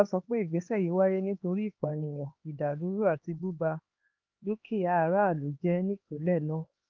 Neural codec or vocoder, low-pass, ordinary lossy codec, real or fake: codec, 16 kHz, 2 kbps, X-Codec, HuBERT features, trained on LibriSpeech; 7.2 kHz; Opus, 32 kbps; fake